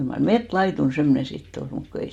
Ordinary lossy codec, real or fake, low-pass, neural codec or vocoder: MP3, 64 kbps; real; 19.8 kHz; none